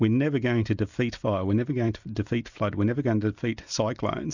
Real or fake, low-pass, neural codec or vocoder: real; 7.2 kHz; none